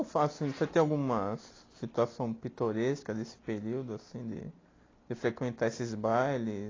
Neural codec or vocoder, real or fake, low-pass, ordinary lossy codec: none; real; 7.2 kHz; AAC, 32 kbps